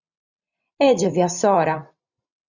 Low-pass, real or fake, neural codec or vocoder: 7.2 kHz; fake; vocoder, 44.1 kHz, 128 mel bands every 512 samples, BigVGAN v2